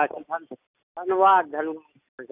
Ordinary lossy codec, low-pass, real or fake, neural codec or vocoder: none; 3.6 kHz; real; none